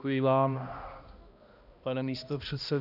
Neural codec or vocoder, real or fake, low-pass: codec, 16 kHz, 1 kbps, X-Codec, HuBERT features, trained on balanced general audio; fake; 5.4 kHz